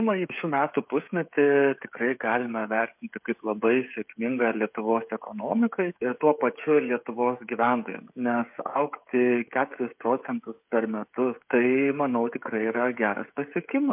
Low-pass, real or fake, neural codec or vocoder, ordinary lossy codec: 3.6 kHz; fake; codec, 16 kHz, 16 kbps, FreqCodec, smaller model; MP3, 32 kbps